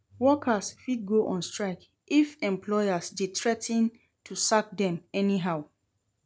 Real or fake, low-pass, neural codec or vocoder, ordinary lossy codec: real; none; none; none